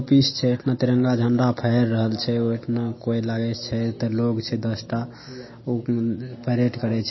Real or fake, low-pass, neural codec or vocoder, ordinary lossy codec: real; 7.2 kHz; none; MP3, 24 kbps